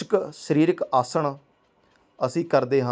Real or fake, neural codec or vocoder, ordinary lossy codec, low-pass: real; none; none; none